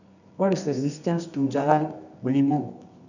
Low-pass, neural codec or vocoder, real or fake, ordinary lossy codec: 7.2 kHz; codec, 24 kHz, 0.9 kbps, WavTokenizer, medium music audio release; fake; none